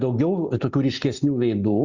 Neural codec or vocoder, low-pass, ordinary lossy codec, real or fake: none; 7.2 kHz; Opus, 64 kbps; real